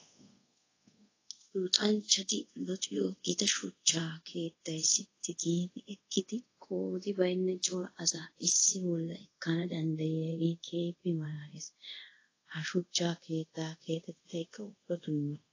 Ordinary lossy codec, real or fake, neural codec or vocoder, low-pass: AAC, 32 kbps; fake; codec, 24 kHz, 0.5 kbps, DualCodec; 7.2 kHz